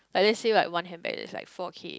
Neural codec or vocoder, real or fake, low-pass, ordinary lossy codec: none; real; none; none